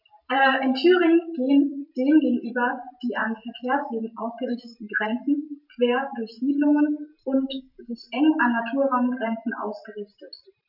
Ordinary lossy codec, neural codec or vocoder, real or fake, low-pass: none; vocoder, 44.1 kHz, 128 mel bands every 512 samples, BigVGAN v2; fake; 5.4 kHz